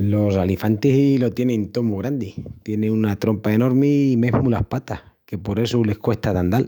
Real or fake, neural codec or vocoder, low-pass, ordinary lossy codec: fake; autoencoder, 48 kHz, 128 numbers a frame, DAC-VAE, trained on Japanese speech; 19.8 kHz; none